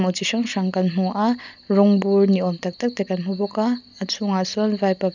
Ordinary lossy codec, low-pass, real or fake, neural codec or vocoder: none; 7.2 kHz; real; none